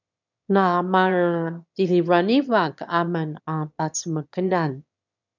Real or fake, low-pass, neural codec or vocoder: fake; 7.2 kHz; autoencoder, 22.05 kHz, a latent of 192 numbers a frame, VITS, trained on one speaker